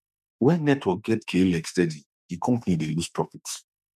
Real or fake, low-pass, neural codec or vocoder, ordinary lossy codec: fake; 14.4 kHz; autoencoder, 48 kHz, 32 numbers a frame, DAC-VAE, trained on Japanese speech; MP3, 96 kbps